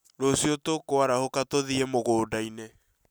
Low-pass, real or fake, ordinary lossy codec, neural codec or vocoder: none; real; none; none